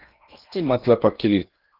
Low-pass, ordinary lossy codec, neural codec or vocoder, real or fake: 5.4 kHz; Opus, 32 kbps; codec, 16 kHz in and 24 kHz out, 0.8 kbps, FocalCodec, streaming, 65536 codes; fake